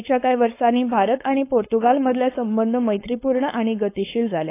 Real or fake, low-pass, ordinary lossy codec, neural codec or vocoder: fake; 3.6 kHz; AAC, 24 kbps; codec, 16 kHz, 4.8 kbps, FACodec